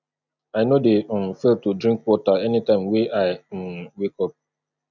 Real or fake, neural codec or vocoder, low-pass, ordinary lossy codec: real; none; 7.2 kHz; none